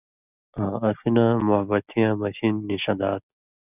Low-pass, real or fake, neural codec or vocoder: 3.6 kHz; real; none